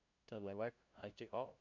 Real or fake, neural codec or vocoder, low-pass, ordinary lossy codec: fake; codec, 16 kHz, 0.5 kbps, FunCodec, trained on LibriTTS, 25 frames a second; 7.2 kHz; none